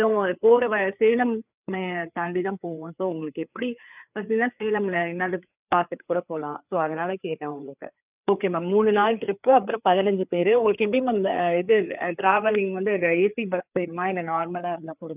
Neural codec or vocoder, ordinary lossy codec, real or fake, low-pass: codec, 16 kHz, 4 kbps, FreqCodec, larger model; none; fake; 3.6 kHz